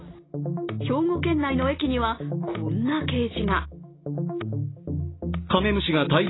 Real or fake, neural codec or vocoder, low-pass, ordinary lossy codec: real; none; 7.2 kHz; AAC, 16 kbps